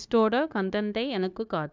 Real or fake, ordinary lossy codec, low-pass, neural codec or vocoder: fake; MP3, 64 kbps; 7.2 kHz; codec, 16 kHz, 0.9 kbps, LongCat-Audio-Codec